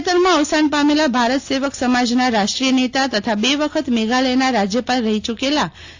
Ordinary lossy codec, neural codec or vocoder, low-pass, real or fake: AAC, 48 kbps; none; 7.2 kHz; real